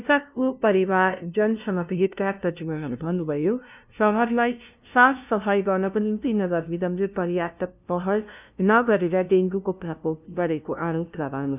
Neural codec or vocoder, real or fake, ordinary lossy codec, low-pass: codec, 16 kHz, 0.5 kbps, FunCodec, trained on LibriTTS, 25 frames a second; fake; none; 3.6 kHz